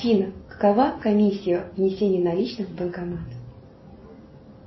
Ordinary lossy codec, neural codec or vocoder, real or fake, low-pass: MP3, 24 kbps; none; real; 7.2 kHz